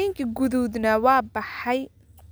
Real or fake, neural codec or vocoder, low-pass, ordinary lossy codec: real; none; none; none